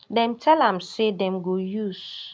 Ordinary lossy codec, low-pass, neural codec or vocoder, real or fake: none; none; none; real